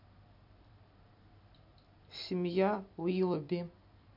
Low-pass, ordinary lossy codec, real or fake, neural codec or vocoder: 5.4 kHz; none; real; none